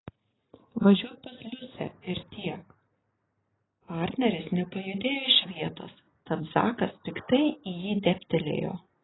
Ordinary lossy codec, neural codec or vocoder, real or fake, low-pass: AAC, 16 kbps; none; real; 7.2 kHz